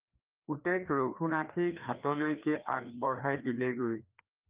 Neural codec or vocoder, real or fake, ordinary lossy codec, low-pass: codec, 16 kHz, 2 kbps, FreqCodec, larger model; fake; Opus, 32 kbps; 3.6 kHz